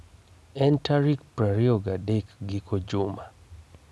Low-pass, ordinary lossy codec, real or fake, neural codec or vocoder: none; none; real; none